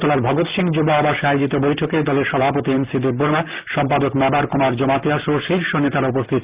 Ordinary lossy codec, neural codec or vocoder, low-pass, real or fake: Opus, 32 kbps; none; 3.6 kHz; real